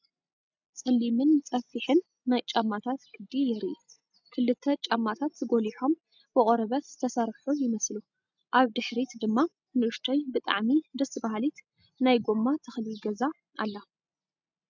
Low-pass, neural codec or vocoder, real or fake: 7.2 kHz; none; real